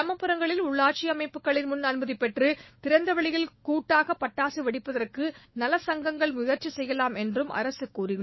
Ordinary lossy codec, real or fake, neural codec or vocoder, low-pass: MP3, 24 kbps; fake; autoencoder, 48 kHz, 128 numbers a frame, DAC-VAE, trained on Japanese speech; 7.2 kHz